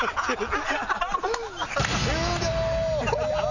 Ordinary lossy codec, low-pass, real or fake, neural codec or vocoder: none; 7.2 kHz; real; none